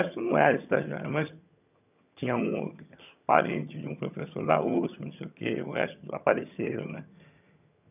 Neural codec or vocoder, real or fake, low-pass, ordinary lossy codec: vocoder, 22.05 kHz, 80 mel bands, HiFi-GAN; fake; 3.6 kHz; none